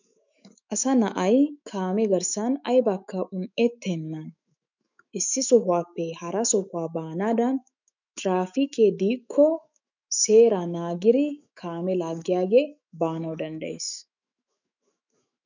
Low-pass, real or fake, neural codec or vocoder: 7.2 kHz; fake; autoencoder, 48 kHz, 128 numbers a frame, DAC-VAE, trained on Japanese speech